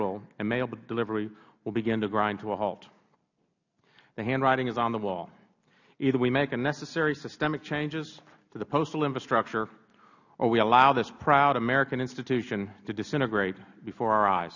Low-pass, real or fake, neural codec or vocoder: 7.2 kHz; real; none